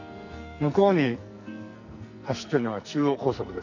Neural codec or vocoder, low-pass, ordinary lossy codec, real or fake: codec, 44.1 kHz, 2.6 kbps, SNAC; 7.2 kHz; none; fake